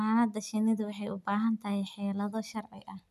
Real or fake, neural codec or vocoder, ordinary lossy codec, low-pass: real; none; none; 14.4 kHz